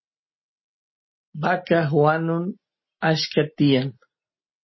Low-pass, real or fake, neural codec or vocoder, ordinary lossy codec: 7.2 kHz; real; none; MP3, 24 kbps